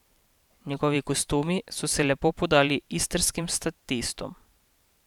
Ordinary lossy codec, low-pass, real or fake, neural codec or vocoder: none; 19.8 kHz; fake; vocoder, 44.1 kHz, 128 mel bands every 512 samples, BigVGAN v2